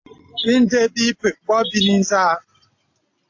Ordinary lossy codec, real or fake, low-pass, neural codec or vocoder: AAC, 48 kbps; real; 7.2 kHz; none